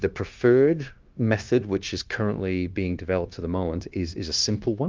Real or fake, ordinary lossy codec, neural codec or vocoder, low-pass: fake; Opus, 32 kbps; codec, 16 kHz, 0.9 kbps, LongCat-Audio-Codec; 7.2 kHz